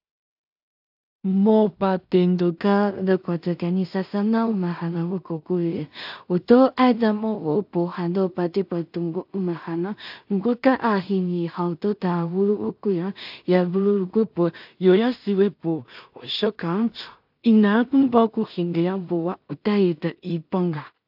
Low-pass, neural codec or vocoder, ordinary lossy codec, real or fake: 5.4 kHz; codec, 16 kHz in and 24 kHz out, 0.4 kbps, LongCat-Audio-Codec, two codebook decoder; AAC, 48 kbps; fake